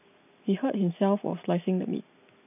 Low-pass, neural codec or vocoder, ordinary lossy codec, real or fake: 3.6 kHz; none; none; real